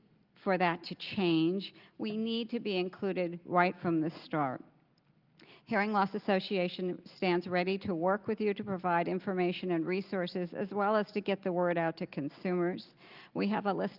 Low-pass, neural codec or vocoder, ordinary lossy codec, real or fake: 5.4 kHz; none; Opus, 24 kbps; real